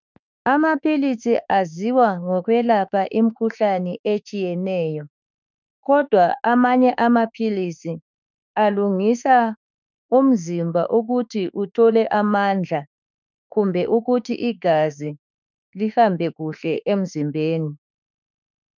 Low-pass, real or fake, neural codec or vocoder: 7.2 kHz; fake; autoencoder, 48 kHz, 32 numbers a frame, DAC-VAE, trained on Japanese speech